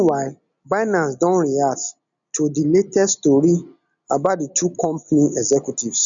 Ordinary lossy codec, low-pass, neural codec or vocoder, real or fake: none; 7.2 kHz; none; real